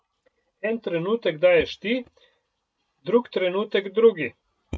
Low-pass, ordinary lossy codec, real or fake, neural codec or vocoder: none; none; real; none